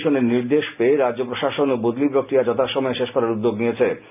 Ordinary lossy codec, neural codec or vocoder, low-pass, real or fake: none; none; 3.6 kHz; real